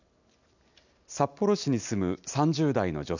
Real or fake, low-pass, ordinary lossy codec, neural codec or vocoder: real; 7.2 kHz; none; none